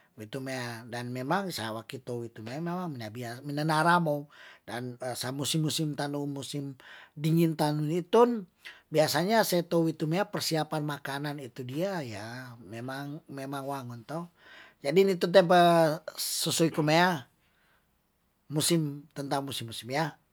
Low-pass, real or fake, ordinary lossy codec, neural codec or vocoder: none; real; none; none